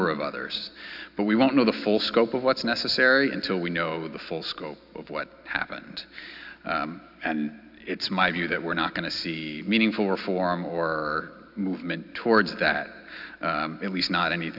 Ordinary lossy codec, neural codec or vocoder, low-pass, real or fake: AAC, 48 kbps; none; 5.4 kHz; real